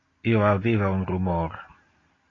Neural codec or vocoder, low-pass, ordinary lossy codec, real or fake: none; 7.2 kHz; AAC, 32 kbps; real